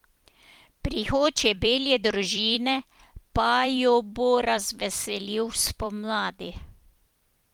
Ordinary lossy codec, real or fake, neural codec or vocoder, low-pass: Opus, 24 kbps; fake; vocoder, 44.1 kHz, 128 mel bands every 256 samples, BigVGAN v2; 19.8 kHz